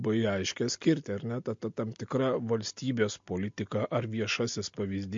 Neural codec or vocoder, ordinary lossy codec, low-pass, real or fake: none; MP3, 48 kbps; 7.2 kHz; real